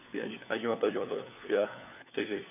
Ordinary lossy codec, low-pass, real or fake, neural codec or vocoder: none; 3.6 kHz; fake; codec, 16 kHz, 4 kbps, FunCodec, trained on LibriTTS, 50 frames a second